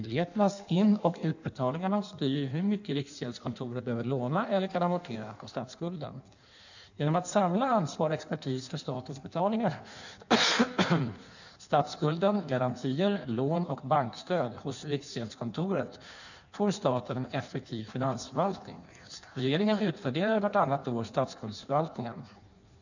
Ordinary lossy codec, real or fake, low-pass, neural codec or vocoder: none; fake; 7.2 kHz; codec, 16 kHz in and 24 kHz out, 1.1 kbps, FireRedTTS-2 codec